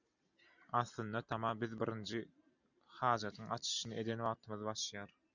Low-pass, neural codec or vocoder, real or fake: 7.2 kHz; none; real